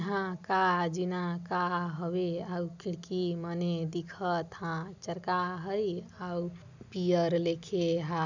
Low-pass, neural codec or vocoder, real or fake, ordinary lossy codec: 7.2 kHz; none; real; none